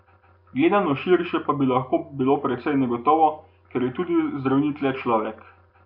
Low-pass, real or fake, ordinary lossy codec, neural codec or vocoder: 5.4 kHz; real; none; none